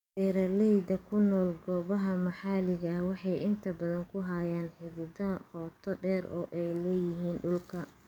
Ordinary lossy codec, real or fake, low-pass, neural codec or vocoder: none; fake; 19.8 kHz; codec, 44.1 kHz, 7.8 kbps, DAC